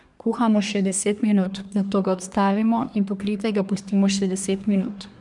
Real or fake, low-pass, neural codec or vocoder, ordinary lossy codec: fake; 10.8 kHz; codec, 24 kHz, 1 kbps, SNAC; none